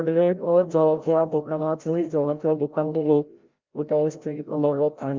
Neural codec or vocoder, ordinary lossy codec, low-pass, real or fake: codec, 16 kHz, 0.5 kbps, FreqCodec, larger model; Opus, 32 kbps; 7.2 kHz; fake